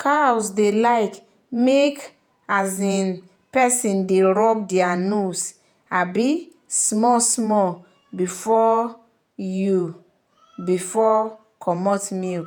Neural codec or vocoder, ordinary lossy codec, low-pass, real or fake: vocoder, 48 kHz, 128 mel bands, Vocos; none; none; fake